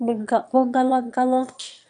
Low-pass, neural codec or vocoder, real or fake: 9.9 kHz; autoencoder, 22.05 kHz, a latent of 192 numbers a frame, VITS, trained on one speaker; fake